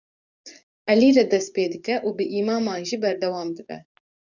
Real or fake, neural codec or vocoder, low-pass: fake; codec, 44.1 kHz, 7.8 kbps, DAC; 7.2 kHz